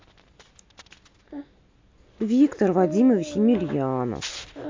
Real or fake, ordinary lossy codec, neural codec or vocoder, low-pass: real; MP3, 48 kbps; none; 7.2 kHz